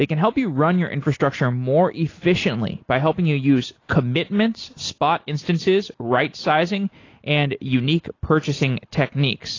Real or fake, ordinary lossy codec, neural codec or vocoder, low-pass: real; AAC, 32 kbps; none; 7.2 kHz